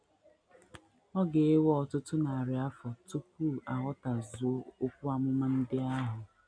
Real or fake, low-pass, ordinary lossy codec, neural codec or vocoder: real; 9.9 kHz; none; none